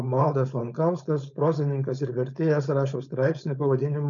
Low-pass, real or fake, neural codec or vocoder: 7.2 kHz; fake; codec, 16 kHz, 4.8 kbps, FACodec